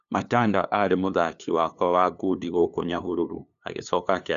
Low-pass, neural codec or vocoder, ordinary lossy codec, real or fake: 7.2 kHz; codec, 16 kHz, 2 kbps, FunCodec, trained on LibriTTS, 25 frames a second; none; fake